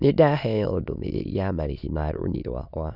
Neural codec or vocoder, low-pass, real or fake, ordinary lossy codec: autoencoder, 22.05 kHz, a latent of 192 numbers a frame, VITS, trained on many speakers; 5.4 kHz; fake; none